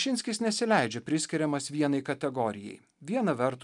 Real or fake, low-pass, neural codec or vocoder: real; 10.8 kHz; none